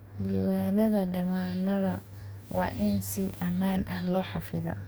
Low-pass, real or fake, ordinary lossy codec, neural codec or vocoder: none; fake; none; codec, 44.1 kHz, 2.6 kbps, DAC